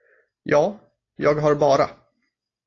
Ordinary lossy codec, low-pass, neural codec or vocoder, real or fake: AAC, 32 kbps; 7.2 kHz; none; real